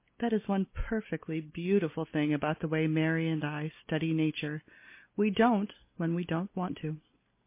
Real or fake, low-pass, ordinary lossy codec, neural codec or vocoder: real; 3.6 kHz; MP3, 24 kbps; none